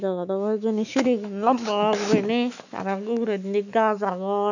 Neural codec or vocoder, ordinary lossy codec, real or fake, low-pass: autoencoder, 48 kHz, 128 numbers a frame, DAC-VAE, trained on Japanese speech; none; fake; 7.2 kHz